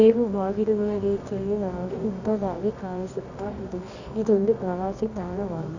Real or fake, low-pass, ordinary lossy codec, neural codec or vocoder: fake; 7.2 kHz; Opus, 64 kbps; codec, 24 kHz, 0.9 kbps, WavTokenizer, medium music audio release